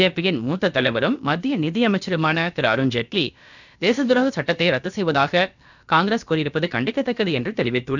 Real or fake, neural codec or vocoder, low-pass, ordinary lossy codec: fake; codec, 16 kHz, about 1 kbps, DyCAST, with the encoder's durations; 7.2 kHz; none